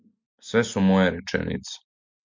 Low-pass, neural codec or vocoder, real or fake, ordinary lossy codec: 7.2 kHz; none; real; MP3, 64 kbps